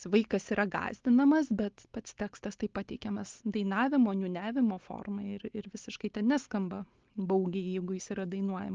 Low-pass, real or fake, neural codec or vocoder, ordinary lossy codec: 7.2 kHz; real; none; Opus, 16 kbps